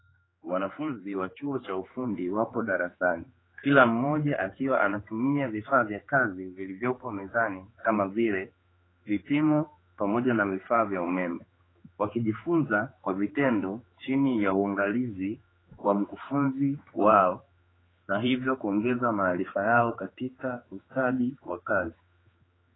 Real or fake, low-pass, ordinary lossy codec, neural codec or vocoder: fake; 7.2 kHz; AAC, 16 kbps; codec, 16 kHz, 4 kbps, X-Codec, HuBERT features, trained on general audio